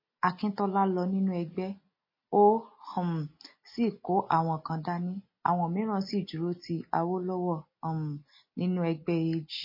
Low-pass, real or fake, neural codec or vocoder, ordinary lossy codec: 5.4 kHz; real; none; MP3, 24 kbps